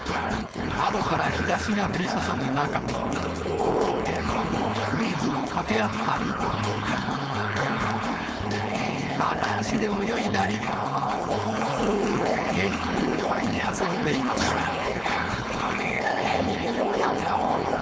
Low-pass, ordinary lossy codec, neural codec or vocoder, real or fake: none; none; codec, 16 kHz, 4.8 kbps, FACodec; fake